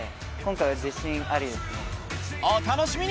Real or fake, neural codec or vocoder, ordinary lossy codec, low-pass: real; none; none; none